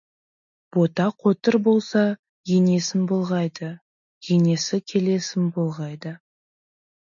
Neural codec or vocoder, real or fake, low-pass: none; real; 7.2 kHz